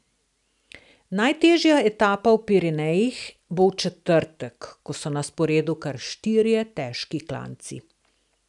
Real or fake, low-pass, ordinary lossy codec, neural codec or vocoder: real; 10.8 kHz; none; none